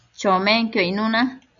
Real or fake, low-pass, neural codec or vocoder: real; 7.2 kHz; none